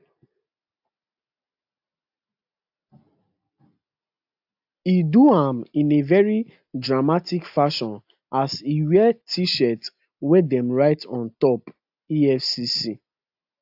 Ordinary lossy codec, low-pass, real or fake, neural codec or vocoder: AAC, 48 kbps; 5.4 kHz; real; none